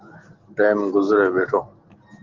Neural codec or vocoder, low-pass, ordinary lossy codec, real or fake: none; 7.2 kHz; Opus, 16 kbps; real